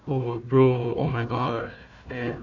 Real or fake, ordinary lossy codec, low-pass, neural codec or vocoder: fake; none; 7.2 kHz; codec, 16 kHz, 1 kbps, FunCodec, trained on Chinese and English, 50 frames a second